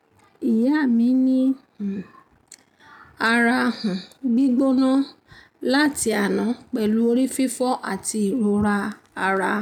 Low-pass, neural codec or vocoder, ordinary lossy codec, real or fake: 19.8 kHz; none; none; real